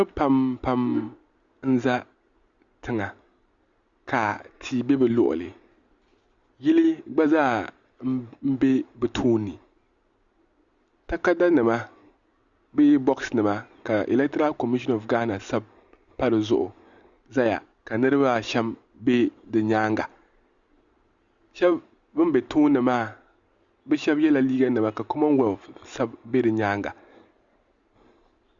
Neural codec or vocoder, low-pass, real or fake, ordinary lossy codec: none; 7.2 kHz; real; Opus, 64 kbps